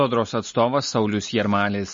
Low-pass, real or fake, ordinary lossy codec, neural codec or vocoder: 7.2 kHz; real; MP3, 32 kbps; none